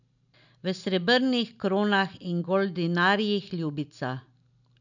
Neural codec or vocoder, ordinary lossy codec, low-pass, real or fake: none; none; 7.2 kHz; real